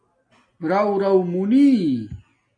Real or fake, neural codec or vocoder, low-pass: real; none; 9.9 kHz